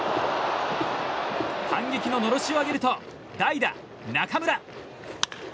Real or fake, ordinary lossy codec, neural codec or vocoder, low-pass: real; none; none; none